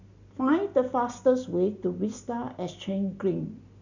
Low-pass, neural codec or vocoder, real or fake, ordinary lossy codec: 7.2 kHz; none; real; none